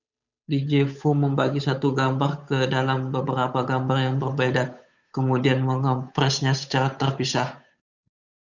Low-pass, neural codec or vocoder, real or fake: 7.2 kHz; codec, 16 kHz, 8 kbps, FunCodec, trained on Chinese and English, 25 frames a second; fake